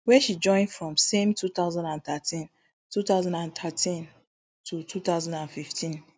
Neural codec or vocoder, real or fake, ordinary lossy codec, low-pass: none; real; none; none